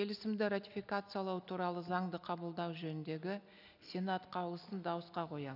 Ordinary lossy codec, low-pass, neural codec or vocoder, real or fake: none; 5.4 kHz; none; real